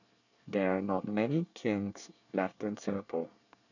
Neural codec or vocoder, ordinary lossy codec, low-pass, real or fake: codec, 24 kHz, 1 kbps, SNAC; none; 7.2 kHz; fake